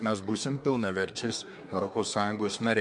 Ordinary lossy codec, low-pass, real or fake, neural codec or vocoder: MP3, 64 kbps; 10.8 kHz; fake; codec, 24 kHz, 1 kbps, SNAC